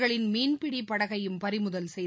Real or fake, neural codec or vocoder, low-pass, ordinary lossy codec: real; none; none; none